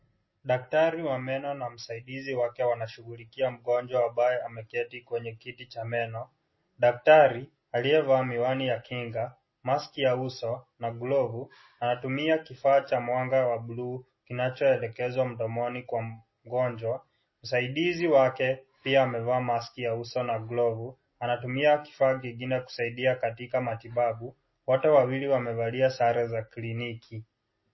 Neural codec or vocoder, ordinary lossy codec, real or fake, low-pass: none; MP3, 24 kbps; real; 7.2 kHz